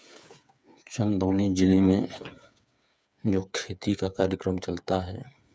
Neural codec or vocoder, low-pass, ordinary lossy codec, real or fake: codec, 16 kHz, 8 kbps, FreqCodec, smaller model; none; none; fake